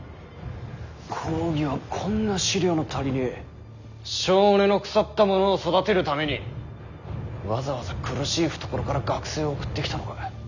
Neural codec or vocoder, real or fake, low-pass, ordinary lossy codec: none; real; 7.2 kHz; none